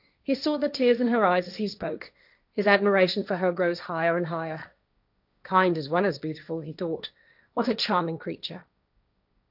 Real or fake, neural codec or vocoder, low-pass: fake; codec, 16 kHz, 1.1 kbps, Voila-Tokenizer; 5.4 kHz